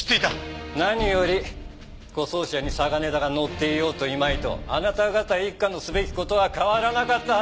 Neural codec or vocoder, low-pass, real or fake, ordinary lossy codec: none; none; real; none